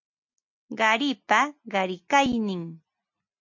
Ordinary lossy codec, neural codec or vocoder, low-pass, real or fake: MP3, 48 kbps; none; 7.2 kHz; real